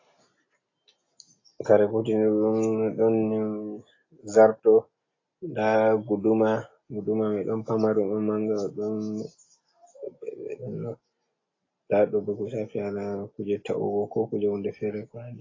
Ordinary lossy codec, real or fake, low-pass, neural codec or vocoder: AAC, 32 kbps; real; 7.2 kHz; none